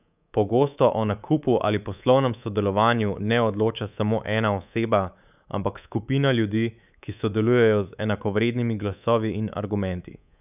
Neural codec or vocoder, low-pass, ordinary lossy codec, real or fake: codec, 24 kHz, 3.1 kbps, DualCodec; 3.6 kHz; none; fake